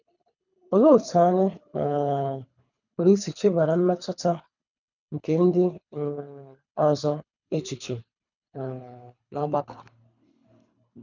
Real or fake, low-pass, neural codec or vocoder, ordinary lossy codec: fake; 7.2 kHz; codec, 24 kHz, 3 kbps, HILCodec; none